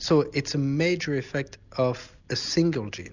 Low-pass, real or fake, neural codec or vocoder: 7.2 kHz; real; none